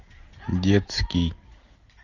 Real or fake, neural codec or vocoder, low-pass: real; none; 7.2 kHz